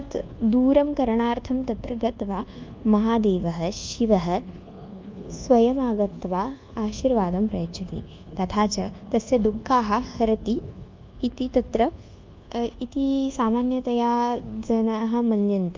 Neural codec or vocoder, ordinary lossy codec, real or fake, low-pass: codec, 24 kHz, 1.2 kbps, DualCodec; Opus, 24 kbps; fake; 7.2 kHz